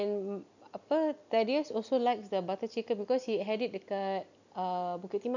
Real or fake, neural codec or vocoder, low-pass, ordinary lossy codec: real; none; 7.2 kHz; none